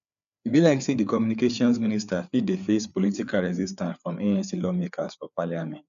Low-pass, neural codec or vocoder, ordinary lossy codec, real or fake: 7.2 kHz; codec, 16 kHz, 4 kbps, FreqCodec, larger model; none; fake